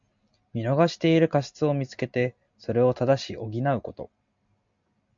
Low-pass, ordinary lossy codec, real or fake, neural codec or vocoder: 7.2 kHz; MP3, 64 kbps; real; none